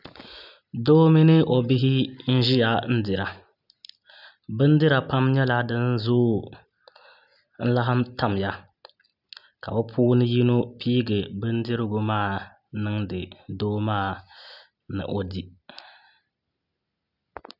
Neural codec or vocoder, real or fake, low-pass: none; real; 5.4 kHz